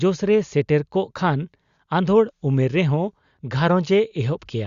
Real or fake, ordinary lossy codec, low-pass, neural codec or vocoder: real; Opus, 64 kbps; 7.2 kHz; none